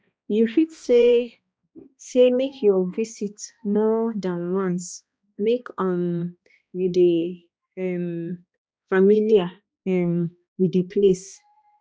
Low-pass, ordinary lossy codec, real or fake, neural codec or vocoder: none; none; fake; codec, 16 kHz, 1 kbps, X-Codec, HuBERT features, trained on balanced general audio